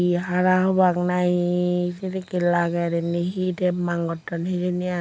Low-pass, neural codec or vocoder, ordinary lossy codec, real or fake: none; none; none; real